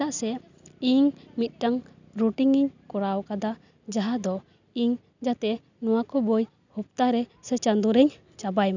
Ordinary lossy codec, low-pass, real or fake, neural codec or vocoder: none; 7.2 kHz; real; none